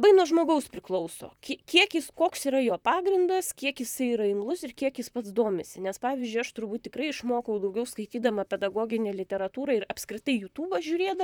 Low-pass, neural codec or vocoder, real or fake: 19.8 kHz; vocoder, 44.1 kHz, 128 mel bands, Pupu-Vocoder; fake